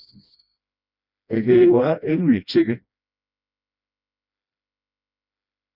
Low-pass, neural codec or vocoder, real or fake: 5.4 kHz; codec, 16 kHz, 1 kbps, FreqCodec, smaller model; fake